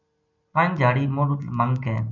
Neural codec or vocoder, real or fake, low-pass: none; real; 7.2 kHz